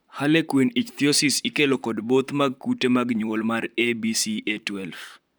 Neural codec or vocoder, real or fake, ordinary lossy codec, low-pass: vocoder, 44.1 kHz, 128 mel bands, Pupu-Vocoder; fake; none; none